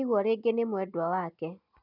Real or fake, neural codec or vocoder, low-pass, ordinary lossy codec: real; none; 5.4 kHz; none